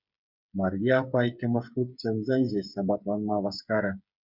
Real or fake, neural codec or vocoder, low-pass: fake; codec, 16 kHz, 8 kbps, FreqCodec, smaller model; 5.4 kHz